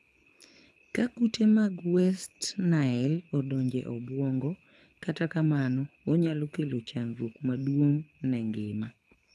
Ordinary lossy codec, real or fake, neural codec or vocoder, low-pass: none; fake; codec, 24 kHz, 6 kbps, HILCodec; none